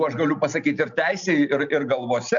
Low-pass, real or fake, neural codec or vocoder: 7.2 kHz; real; none